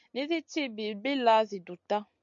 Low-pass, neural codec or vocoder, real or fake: 7.2 kHz; none; real